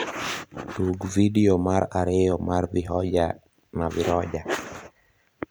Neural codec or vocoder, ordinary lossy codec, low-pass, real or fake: vocoder, 44.1 kHz, 128 mel bands every 256 samples, BigVGAN v2; none; none; fake